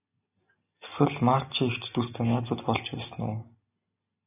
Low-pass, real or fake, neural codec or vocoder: 3.6 kHz; real; none